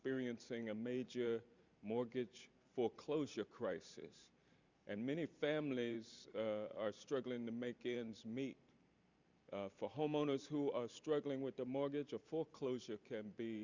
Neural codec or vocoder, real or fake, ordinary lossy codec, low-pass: vocoder, 44.1 kHz, 128 mel bands every 512 samples, BigVGAN v2; fake; Opus, 64 kbps; 7.2 kHz